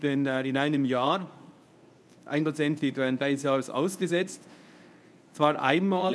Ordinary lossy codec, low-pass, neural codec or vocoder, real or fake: none; none; codec, 24 kHz, 0.9 kbps, WavTokenizer, medium speech release version 1; fake